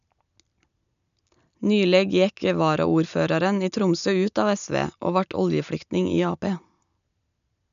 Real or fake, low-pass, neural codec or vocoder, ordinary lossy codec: real; 7.2 kHz; none; none